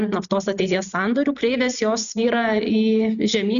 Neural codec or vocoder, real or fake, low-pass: none; real; 7.2 kHz